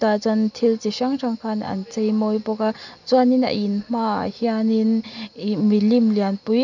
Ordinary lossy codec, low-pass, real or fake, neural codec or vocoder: none; 7.2 kHz; real; none